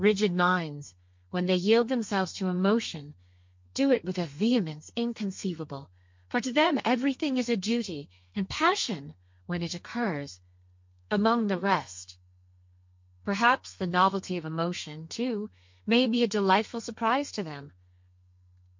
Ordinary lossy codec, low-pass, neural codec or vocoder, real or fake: MP3, 48 kbps; 7.2 kHz; codec, 32 kHz, 1.9 kbps, SNAC; fake